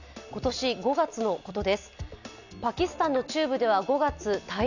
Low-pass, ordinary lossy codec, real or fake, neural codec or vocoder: 7.2 kHz; none; real; none